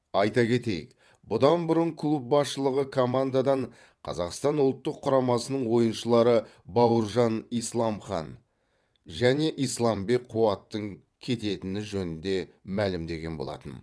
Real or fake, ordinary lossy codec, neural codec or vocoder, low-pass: fake; none; vocoder, 22.05 kHz, 80 mel bands, WaveNeXt; none